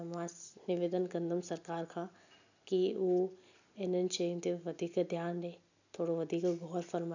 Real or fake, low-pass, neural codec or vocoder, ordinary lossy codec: real; 7.2 kHz; none; none